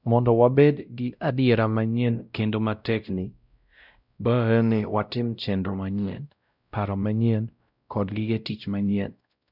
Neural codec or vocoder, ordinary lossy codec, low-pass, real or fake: codec, 16 kHz, 0.5 kbps, X-Codec, WavLM features, trained on Multilingual LibriSpeech; none; 5.4 kHz; fake